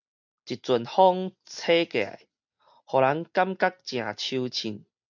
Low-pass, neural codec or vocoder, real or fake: 7.2 kHz; none; real